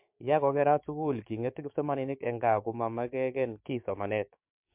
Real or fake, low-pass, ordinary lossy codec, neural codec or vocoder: fake; 3.6 kHz; MP3, 32 kbps; codec, 16 kHz, 6 kbps, DAC